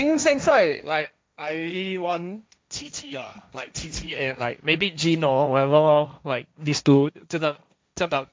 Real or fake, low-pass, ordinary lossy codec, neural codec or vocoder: fake; none; none; codec, 16 kHz, 1.1 kbps, Voila-Tokenizer